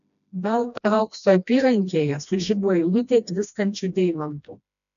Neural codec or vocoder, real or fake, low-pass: codec, 16 kHz, 1 kbps, FreqCodec, smaller model; fake; 7.2 kHz